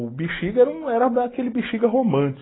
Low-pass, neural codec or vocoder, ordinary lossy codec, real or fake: 7.2 kHz; none; AAC, 16 kbps; real